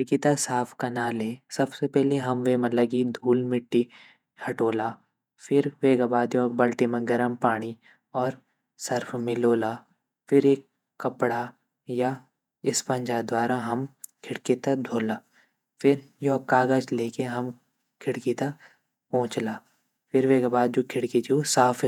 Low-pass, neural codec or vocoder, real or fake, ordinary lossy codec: 19.8 kHz; vocoder, 48 kHz, 128 mel bands, Vocos; fake; none